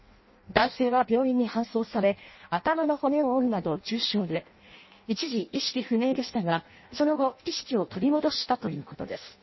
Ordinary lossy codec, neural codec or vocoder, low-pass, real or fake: MP3, 24 kbps; codec, 16 kHz in and 24 kHz out, 0.6 kbps, FireRedTTS-2 codec; 7.2 kHz; fake